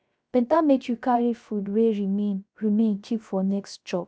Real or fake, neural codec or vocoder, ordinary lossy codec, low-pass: fake; codec, 16 kHz, 0.3 kbps, FocalCodec; none; none